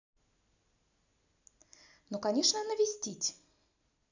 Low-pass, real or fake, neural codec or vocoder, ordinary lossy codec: 7.2 kHz; real; none; none